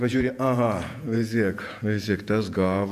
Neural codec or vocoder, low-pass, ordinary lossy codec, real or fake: vocoder, 48 kHz, 128 mel bands, Vocos; 14.4 kHz; AAC, 96 kbps; fake